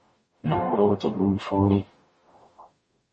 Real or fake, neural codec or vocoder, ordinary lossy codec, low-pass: fake; codec, 44.1 kHz, 0.9 kbps, DAC; MP3, 32 kbps; 10.8 kHz